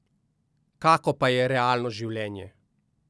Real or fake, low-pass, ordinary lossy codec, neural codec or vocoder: real; none; none; none